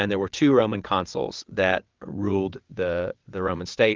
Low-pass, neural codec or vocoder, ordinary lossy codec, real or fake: 7.2 kHz; vocoder, 22.05 kHz, 80 mel bands, WaveNeXt; Opus, 32 kbps; fake